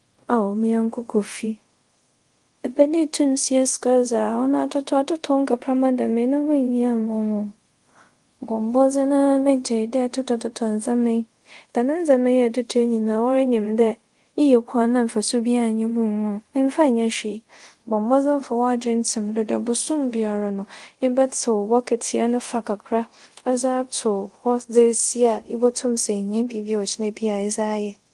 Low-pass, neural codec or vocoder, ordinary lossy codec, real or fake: 10.8 kHz; codec, 24 kHz, 0.5 kbps, DualCodec; Opus, 24 kbps; fake